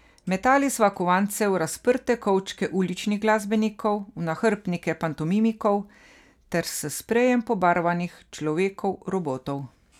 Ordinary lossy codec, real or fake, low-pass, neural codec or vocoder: none; real; 19.8 kHz; none